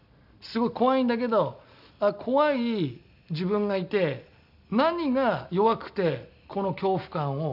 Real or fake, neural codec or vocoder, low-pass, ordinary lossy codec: real; none; 5.4 kHz; none